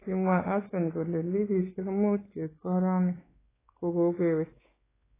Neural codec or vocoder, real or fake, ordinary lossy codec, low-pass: codec, 16 kHz, 4 kbps, X-Codec, WavLM features, trained on Multilingual LibriSpeech; fake; AAC, 16 kbps; 3.6 kHz